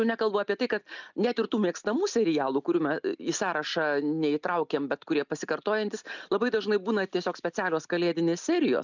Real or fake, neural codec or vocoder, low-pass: real; none; 7.2 kHz